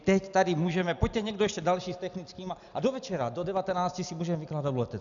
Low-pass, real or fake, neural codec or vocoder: 7.2 kHz; real; none